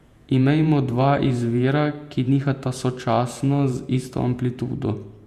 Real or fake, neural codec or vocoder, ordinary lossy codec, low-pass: fake; vocoder, 48 kHz, 128 mel bands, Vocos; Opus, 64 kbps; 14.4 kHz